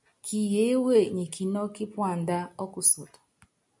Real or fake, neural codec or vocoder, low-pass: real; none; 10.8 kHz